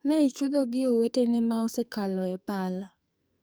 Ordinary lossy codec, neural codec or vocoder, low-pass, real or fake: none; codec, 44.1 kHz, 2.6 kbps, SNAC; none; fake